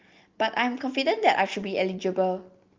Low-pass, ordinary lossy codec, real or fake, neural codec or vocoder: 7.2 kHz; Opus, 32 kbps; real; none